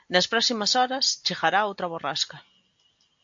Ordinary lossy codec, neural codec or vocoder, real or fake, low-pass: AAC, 64 kbps; none; real; 7.2 kHz